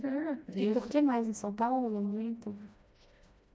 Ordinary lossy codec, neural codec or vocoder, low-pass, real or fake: none; codec, 16 kHz, 1 kbps, FreqCodec, smaller model; none; fake